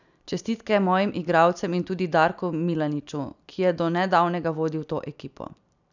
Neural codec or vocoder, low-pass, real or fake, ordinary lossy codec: none; 7.2 kHz; real; none